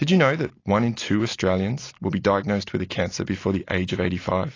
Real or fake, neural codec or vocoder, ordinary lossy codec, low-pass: real; none; AAC, 32 kbps; 7.2 kHz